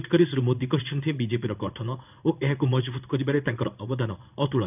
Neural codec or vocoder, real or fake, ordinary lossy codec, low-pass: codec, 16 kHz in and 24 kHz out, 1 kbps, XY-Tokenizer; fake; none; 3.6 kHz